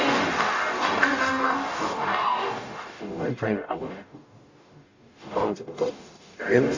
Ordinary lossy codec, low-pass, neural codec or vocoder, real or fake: AAC, 48 kbps; 7.2 kHz; codec, 44.1 kHz, 0.9 kbps, DAC; fake